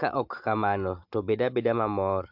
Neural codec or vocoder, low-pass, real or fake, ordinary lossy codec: none; 5.4 kHz; real; MP3, 48 kbps